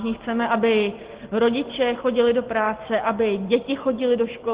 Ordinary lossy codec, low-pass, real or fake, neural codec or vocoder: Opus, 16 kbps; 3.6 kHz; real; none